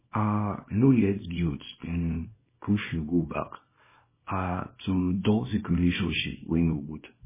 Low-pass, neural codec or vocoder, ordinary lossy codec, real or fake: 3.6 kHz; codec, 24 kHz, 0.9 kbps, WavTokenizer, small release; MP3, 16 kbps; fake